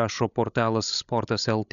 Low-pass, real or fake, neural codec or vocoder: 7.2 kHz; fake; codec, 16 kHz, 16 kbps, FreqCodec, larger model